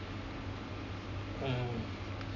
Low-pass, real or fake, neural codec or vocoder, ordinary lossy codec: 7.2 kHz; real; none; none